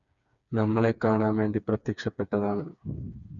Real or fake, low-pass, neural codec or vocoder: fake; 7.2 kHz; codec, 16 kHz, 4 kbps, FreqCodec, smaller model